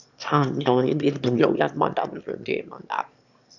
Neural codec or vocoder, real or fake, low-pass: autoencoder, 22.05 kHz, a latent of 192 numbers a frame, VITS, trained on one speaker; fake; 7.2 kHz